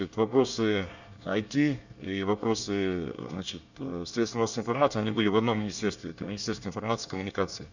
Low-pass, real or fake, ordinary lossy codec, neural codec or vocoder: 7.2 kHz; fake; none; codec, 24 kHz, 1 kbps, SNAC